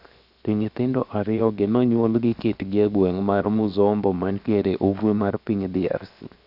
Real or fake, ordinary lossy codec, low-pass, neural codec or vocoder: fake; none; 5.4 kHz; codec, 16 kHz, 0.7 kbps, FocalCodec